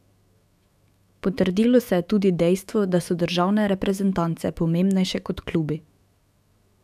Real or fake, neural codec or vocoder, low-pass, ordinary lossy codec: fake; autoencoder, 48 kHz, 128 numbers a frame, DAC-VAE, trained on Japanese speech; 14.4 kHz; none